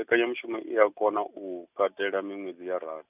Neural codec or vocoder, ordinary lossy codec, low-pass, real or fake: none; none; 3.6 kHz; real